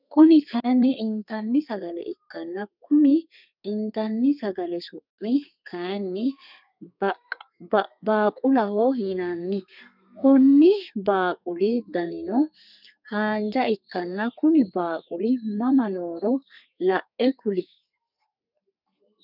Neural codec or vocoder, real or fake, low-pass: codec, 32 kHz, 1.9 kbps, SNAC; fake; 5.4 kHz